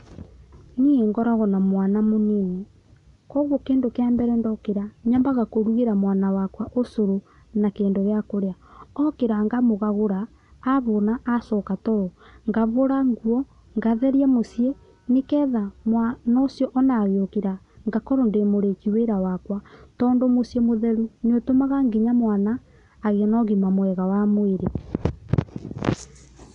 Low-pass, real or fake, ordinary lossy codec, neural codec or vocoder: 10.8 kHz; real; none; none